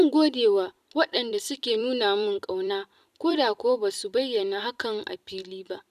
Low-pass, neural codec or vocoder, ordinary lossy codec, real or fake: 14.4 kHz; vocoder, 44.1 kHz, 128 mel bands every 256 samples, BigVGAN v2; none; fake